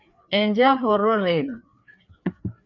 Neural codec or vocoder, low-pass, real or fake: codec, 16 kHz, 4 kbps, FreqCodec, larger model; 7.2 kHz; fake